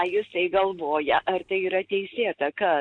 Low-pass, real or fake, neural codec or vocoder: 9.9 kHz; real; none